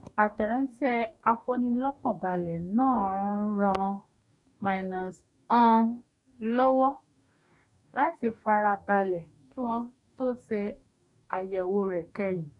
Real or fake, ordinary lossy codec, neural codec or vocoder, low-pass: fake; none; codec, 44.1 kHz, 2.6 kbps, DAC; 10.8 kHz